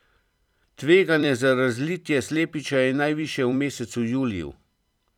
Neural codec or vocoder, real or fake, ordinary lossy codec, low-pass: vocoder, 44.1 kHz, 128 mel bands every 256 samples, BigVGAN v2; fake; none; 19.8 kHz